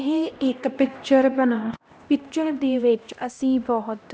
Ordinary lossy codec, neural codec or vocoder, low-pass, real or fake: none; codec, 16 kHz, 1 kbps, X-Codec, HuBERT features, trained on LibriSpeech; none; fake